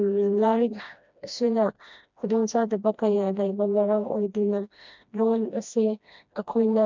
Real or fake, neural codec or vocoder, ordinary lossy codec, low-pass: fake; codec, 16 kHz, 1 kbps, FreqCodec, smaller model; MP3, 64 kbps; 7.2 kHz